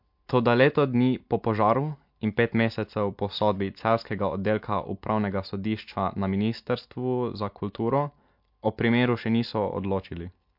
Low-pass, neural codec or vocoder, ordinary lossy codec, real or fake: 5.4 kHz; none; MP3, 48 kbps; real